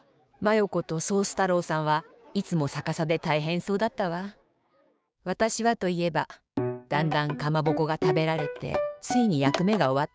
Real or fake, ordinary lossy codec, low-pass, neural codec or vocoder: fake; none; none; codec, 16 kHz, 6 kbps, DAC